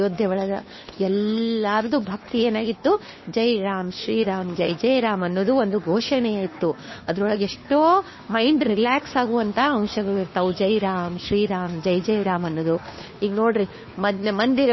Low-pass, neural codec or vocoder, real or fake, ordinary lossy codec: 7.2 kHz; codec, 16 kHz, 2 kbps, FunCodec, trained on Chinese and English, 25 frames a second; fake; MP3, 24 kbps